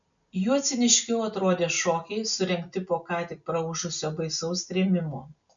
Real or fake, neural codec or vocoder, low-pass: real; none; 7.2 kHz